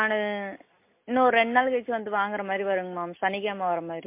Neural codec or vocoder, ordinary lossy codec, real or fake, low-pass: none; MP3, 24 kbps; real; 3.6 kHz